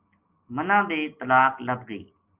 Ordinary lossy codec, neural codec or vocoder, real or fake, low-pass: Opus, 32 kbps; none; real; 3.6 kHz